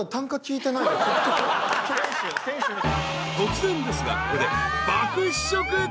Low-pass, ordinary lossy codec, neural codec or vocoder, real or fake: none; none; none; real